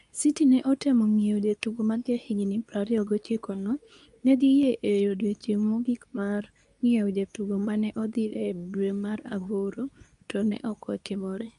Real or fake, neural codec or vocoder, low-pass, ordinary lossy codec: fake; codec, 24 kHz, 0.9 kbps, WavTokenizer, medium speech release version 2; 10.8 kHz; none